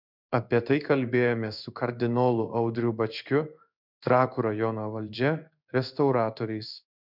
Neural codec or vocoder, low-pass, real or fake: codec, 16 kHz in and 24 kHz out, 1 kbps, XY-Tokenizer; 5.4 kHz; fake